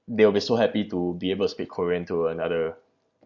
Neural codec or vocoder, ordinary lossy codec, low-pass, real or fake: none; Opus, 64 kbps; 7.2 kHz; real